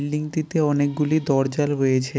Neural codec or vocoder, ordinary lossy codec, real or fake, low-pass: none; none; real; none